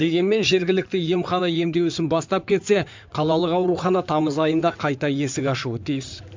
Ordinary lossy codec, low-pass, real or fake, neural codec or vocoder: MP3, 64 kbps; 7.2 kHz; fake; codec, 16 kHz in and 24 kHz out, 2.2 kbps, FireRedTTS-2 codec